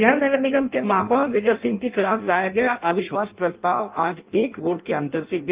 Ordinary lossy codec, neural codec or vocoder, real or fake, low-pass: Opus, 32 kbps; codec, 16 kHz in and 24 kHz out, 0.6 kbps, FireRedTTS-2 codec; fake; 3.6 kHz